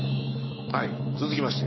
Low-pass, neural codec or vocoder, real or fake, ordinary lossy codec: 7.2 kHz; autoencoder, 48 kHz, 128 numbers a frame, DAC-VAE, trained on Japanese speech; fake; MP3, 24 kbps